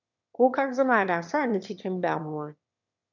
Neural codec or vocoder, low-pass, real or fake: autoencoder, 22.05 kHz, a latent of 192 numbers a frame, VITS, trained on one speaker; 7.2 kHz; fake